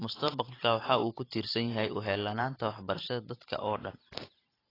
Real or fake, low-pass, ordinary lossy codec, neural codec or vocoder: fake; 5.4 kHz; AAC, 24 kbps; vocoder, 44.1 kHz, 128 mel bands every 512 samples, BigVGAN v2